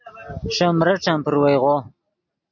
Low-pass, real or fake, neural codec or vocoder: 7.2 kHz; real; none